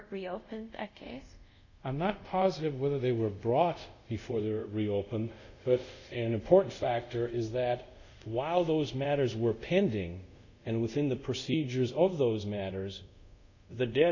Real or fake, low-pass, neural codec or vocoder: fake; 7.2 kHz; codec, 24 kHz, 0.5 kbps, DualCodec